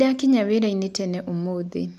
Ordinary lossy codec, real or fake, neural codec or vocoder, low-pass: AAC, 96 kbps; real; none; 14.4 kHz